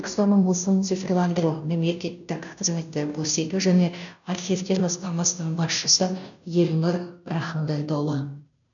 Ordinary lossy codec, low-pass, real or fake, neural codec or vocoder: none; 7.2 kHz; fake; codec, 16 kHz, 0.5 kbps, FunCodec, trained on Chinese and English, 25 frames a second